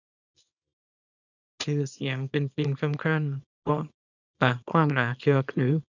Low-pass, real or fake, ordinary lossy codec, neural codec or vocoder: 7.2 kHz; fake; none; codec, 24 kHz, 0.9 kbps, WavTokenizer, small release